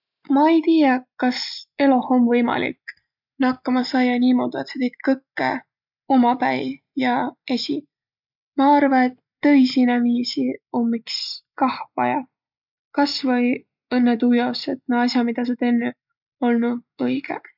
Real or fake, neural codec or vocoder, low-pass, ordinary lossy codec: real; none; 5.4 kHz; none